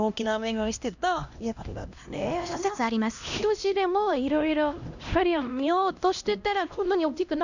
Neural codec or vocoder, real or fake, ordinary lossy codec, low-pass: codec, 16 kHz, 1 kbps, X-Codec, HuBERT features, trained on LibriSpeech; fake; none; 7.2 kHz